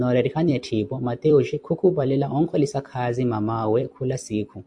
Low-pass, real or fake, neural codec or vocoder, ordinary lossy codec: 9.9 kHz; fake; vocoder, 44.1 kHz, 128 mel bands every 256 samples, BigVGAN v2; AAC, 64 kbps